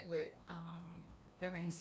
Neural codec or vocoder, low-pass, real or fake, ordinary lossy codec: codec, 16 kHz, 1 kbps, FreqCodec, larger model; none; fake; none